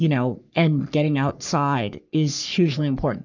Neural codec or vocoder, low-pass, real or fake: codec, 44.1 kHz, 3.4 kbps, Pupu-Codec; 7.2 kHz; fake